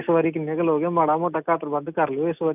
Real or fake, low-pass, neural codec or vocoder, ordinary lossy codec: real; 3.6 kHz; none; none